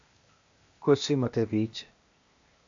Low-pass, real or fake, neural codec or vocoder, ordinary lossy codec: 7.2 kHz; fake; codec, 16 kHz, 0.8 kbps, ZipCodec; AAC, 64 kbps